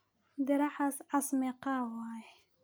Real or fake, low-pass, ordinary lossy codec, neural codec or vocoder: real; none; none; none